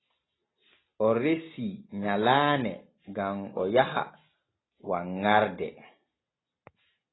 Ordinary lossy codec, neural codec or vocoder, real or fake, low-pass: AAC, 16 kbps; none; real; 7.2 kHz